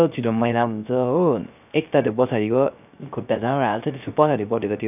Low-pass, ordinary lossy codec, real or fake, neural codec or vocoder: 3.6 kHz; none; fake; codec, 16 kHz, 0.3 kbps, FocalCodec